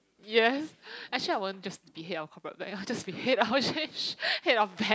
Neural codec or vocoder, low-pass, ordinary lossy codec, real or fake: none; none; none; real